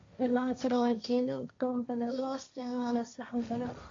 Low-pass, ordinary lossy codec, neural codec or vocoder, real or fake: 7.2 kHz; MP3, 48 kbps; codec, 16 kHz, 1.1 kbps, Voila-Tokenizer; fake